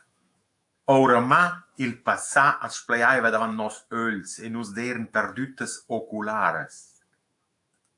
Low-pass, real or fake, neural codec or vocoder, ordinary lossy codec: 10.8 kHz; fake; autoencoder, 48 kHz, 128 numbers a frame, DAC-VAE, trained on Japanese speech; AAC, 64 kbps